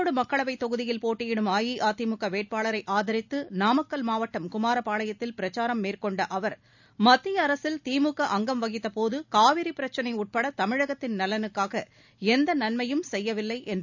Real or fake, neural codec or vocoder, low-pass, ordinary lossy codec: real; none; 7.2 kHz; none